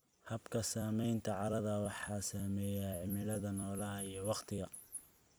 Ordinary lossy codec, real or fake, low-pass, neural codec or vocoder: none; fake; none; vocoder, 44.1 kHz, 128 mel bands every 256 samples, BigVGAN v2